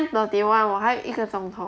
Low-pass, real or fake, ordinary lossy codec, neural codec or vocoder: none; real; none; none